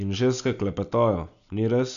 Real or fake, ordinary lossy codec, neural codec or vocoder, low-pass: real; none; none; 7.2 kHz